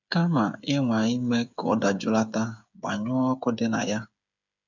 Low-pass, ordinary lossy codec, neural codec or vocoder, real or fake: 7.2 kHz; none; codec, 16 kHz, 8 kbps, FreqCodec, smaller model; fake